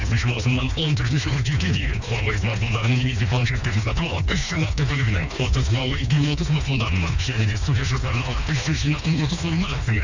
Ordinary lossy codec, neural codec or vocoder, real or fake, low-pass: Opus, 64 kbps; codec, 16 kHz, 2 kbps, FreqCodec, smaller model; fake; 7.2 kHz